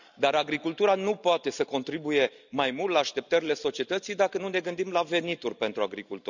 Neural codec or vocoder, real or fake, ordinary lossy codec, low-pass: none; real; none; 7.2 kHz